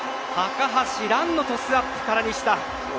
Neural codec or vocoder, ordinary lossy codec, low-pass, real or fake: none; none; none; real